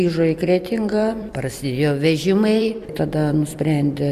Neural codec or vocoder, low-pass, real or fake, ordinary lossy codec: vocoder, 44.1 kHz, 128 mel bands every 256 samples, BigVGAN v2; 14.4 kHz; fake; Opus, 64 kbps